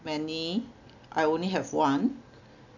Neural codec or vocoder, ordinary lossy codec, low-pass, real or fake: none; none; 7.2 kHz; real